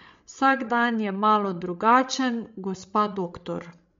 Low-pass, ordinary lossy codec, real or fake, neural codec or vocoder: 7.2 kHz; MP3, 48 kbps; fake; codec, 16 kHz, 16 kbps, FreqCodec, larger model